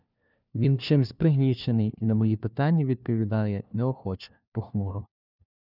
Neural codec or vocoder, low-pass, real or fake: codec, 16 kHz, 1 kbps, FunCodec, trained on LibriTTS, 50 frames a second; 5.4 kHz; fake